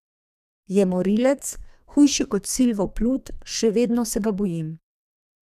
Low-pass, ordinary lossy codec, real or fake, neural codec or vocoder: 14.4 kHz; none; fake; codec, 32 kHz, 1.9 kbps, SNAC